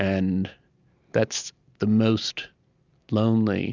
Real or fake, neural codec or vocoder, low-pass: real; none; 7.2 kHz